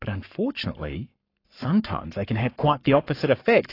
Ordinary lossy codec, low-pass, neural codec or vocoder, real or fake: AAC, 32 kbps; 5.4 kHz; codec, 44.1 kHz, 7.8 kbps, Pupu-Codec; fake